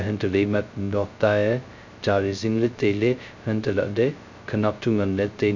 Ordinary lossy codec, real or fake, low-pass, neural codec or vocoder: none; fake; 7.2 kHz; codec, 16 kHz, 0.2 kbps, FocalCodec